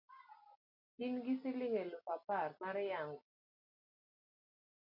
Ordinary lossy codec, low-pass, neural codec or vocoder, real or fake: MP3, 32 kbps; 5.4 kHz; none; real